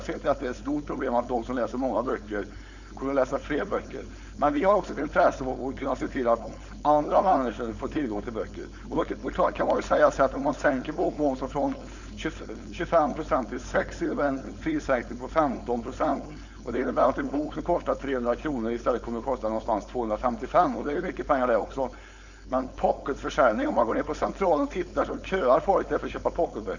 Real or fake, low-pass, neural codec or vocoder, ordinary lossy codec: fake; 7.2 kHz; codec, 16 kHz, 4.8 kbps, FACodec; none